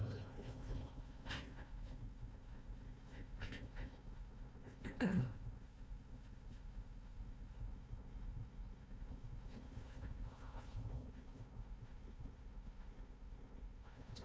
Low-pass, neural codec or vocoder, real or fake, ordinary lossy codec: none; codec, 16 kHz, 1 kbps, FunCodec, trained on Chinese and English, 50 frames a second; fake; none